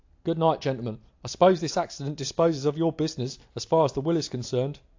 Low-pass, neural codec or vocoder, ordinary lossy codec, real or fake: 7.2 kHz; none; AAC, 48 kbps; real